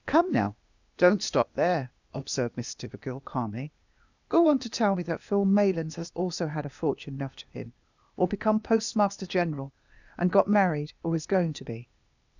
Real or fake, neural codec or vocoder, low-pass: fake; codec, 16 kHz, 0.8 kbps, ZipCodec; 7.2 kHz